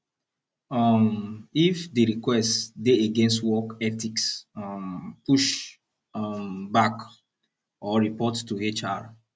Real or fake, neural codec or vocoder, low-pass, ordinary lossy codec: real; none; none; none